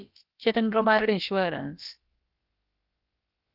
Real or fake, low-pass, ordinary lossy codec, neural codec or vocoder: fake; 5.4 kHz; Opus, 32 kbps; codec, 16 kHz, about 1 kbps, DyCAST, with the encoder's durations